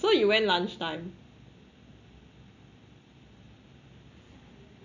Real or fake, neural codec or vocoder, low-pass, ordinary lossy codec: real; none; 7.2 kHz; none